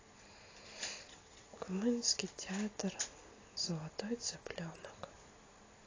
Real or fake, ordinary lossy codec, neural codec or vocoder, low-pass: real; AAC, 32 kbps; none; 7.2 kHz